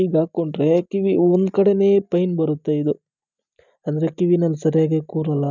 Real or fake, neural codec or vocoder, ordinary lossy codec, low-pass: real; none; none; 7.2 kHz